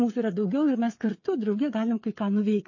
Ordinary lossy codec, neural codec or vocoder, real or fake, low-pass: MP3, 32 kbps; codec, 16 kHz, 16 kbps, FreqCodec, smaller model; fake; 7.2 kHz